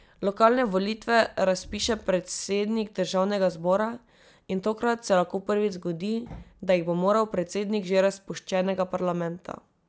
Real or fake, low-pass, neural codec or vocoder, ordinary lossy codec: real; none; none; none